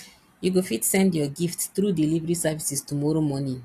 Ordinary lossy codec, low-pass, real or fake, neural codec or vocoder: AAC, 64 kbps; 14.4 kHz; real; none